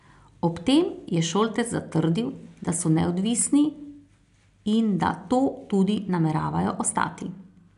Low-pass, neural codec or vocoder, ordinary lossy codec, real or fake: 10.8 kHz; none; MP3, 96 kbps; real